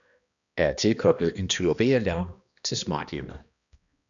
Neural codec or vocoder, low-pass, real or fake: codec, 16 kHz, 1 kbps, X-Codec, HuBERT features, trained on balanced general audio; 7.2 kHz; fake